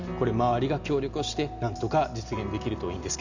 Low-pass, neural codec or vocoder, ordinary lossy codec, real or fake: 7.2 kHz; none; none; real